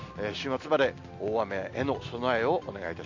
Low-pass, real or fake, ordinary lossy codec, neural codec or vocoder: 7.2 kHz; real; MP3, 48 kbps; none